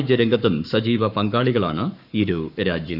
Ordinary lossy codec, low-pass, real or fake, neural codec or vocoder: none; 5.4 kHz; fake; codec, 44.1 kHz, 7.8 kbps, Pupu-Codec